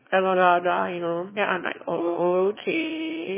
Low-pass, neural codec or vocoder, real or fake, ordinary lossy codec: 3.6 kHz; autoencoder, 22.05 kHz, a latent of 192 numbers a frame, VITS, trained on one speaker; fake; MP3, 16 kbps